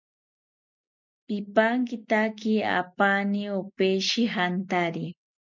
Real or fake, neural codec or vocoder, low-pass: real; none; 7.2 kHz